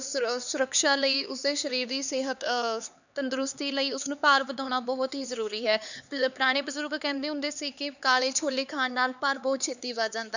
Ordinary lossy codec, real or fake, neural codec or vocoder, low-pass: none; fake; codec, 16 kHz, 4 kbps, X-Codec, HuBERT features, trained on LibriSpeech; 7.2 kHz